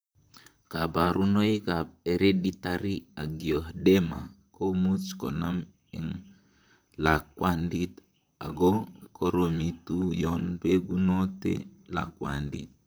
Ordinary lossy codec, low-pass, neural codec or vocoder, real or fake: none; none; vocoder, 44.1 kHz, 128 mel bands, Pupu-Vocoder; fake